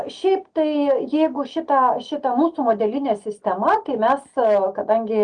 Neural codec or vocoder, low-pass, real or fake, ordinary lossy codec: none; 9.9 kHz; real; Opus, 24 kbps